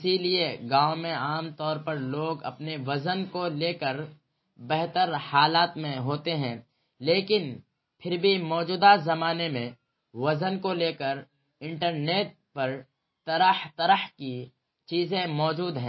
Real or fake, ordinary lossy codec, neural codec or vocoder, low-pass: real; MP3, 24 kbps; none; 7.2 kHz